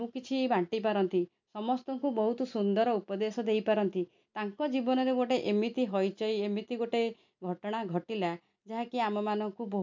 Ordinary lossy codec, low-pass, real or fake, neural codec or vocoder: MP3, 64 kbps; 7.2 kHz; real; none